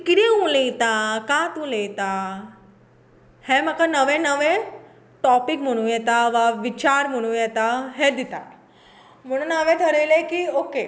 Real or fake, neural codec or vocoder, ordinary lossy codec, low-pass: real; none; none; none